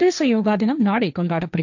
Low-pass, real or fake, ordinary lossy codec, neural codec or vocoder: 7.2 kHz; fake; none; codec, 16 kHz, 1.1 kbps, Voila-Tokenizer